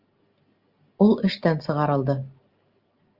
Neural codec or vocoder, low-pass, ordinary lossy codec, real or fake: none; 5.4 kHz; Opus, 32 kbps; real